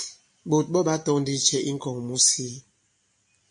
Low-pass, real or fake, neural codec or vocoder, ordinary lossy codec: 9.9 kHz; real; none; MP3, 48 kbps